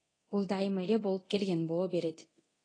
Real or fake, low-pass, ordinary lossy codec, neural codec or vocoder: fake; 9.9 kHz; AAC, 32 kbps; codec, 24 kHz, 0.9 kbps, DualCodec